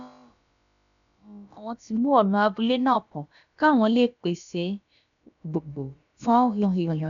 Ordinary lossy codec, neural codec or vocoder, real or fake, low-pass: none; codec, 16 kHz, about 1 kbps, DyCAST, with the encoder's durations; fake; 7.2 kHz